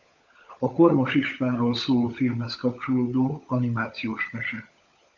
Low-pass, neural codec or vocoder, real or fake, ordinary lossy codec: 7.2 kHz; codec, 16 kHz, 16 kbps, FunCodec, trained on LibriTTS, 50 frames a second; fake; MP3, 64 kbps